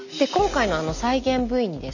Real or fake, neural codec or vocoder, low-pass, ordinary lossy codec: real; none; 7.2 kHz; none